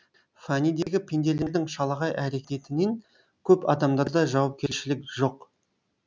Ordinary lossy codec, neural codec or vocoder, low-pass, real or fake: none; none; none; real